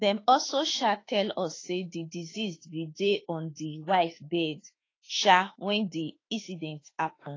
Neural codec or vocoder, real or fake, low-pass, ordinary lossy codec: autoencoder, 48 kHz, 32 numbers a frame, DAC-VAE, trained on Japanese speech; fake; 7.2 kHz; AAC, 32 kbps